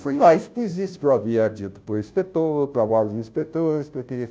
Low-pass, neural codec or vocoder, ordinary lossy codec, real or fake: none; codec, 16 kHz, 0.5 kbps, FunCodec, trained on Chinese and English, 25 frames a second; none; fake